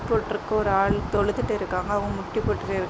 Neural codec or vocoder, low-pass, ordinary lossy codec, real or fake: none; none; none; real